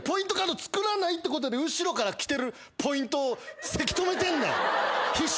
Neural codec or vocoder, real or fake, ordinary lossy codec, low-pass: none; real; none; none